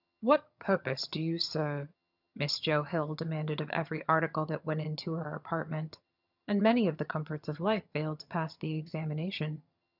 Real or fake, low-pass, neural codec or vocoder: fake; 5.4 kHz; vocoder, 22.05 kHz, 80 mel bands, HiFi-GAN